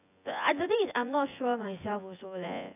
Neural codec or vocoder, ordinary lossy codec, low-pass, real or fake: vocoder, 24 kHz, 100 mel bands, Vocos; none; 3.6 kHz; fake